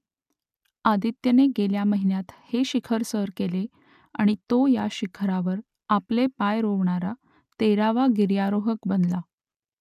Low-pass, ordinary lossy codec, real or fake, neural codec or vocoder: 14.4 kHz; none; real; none